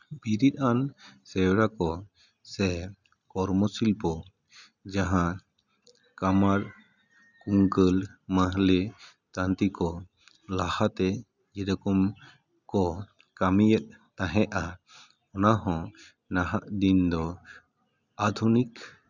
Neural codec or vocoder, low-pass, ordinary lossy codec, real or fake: none; 7.2 kHz; none; real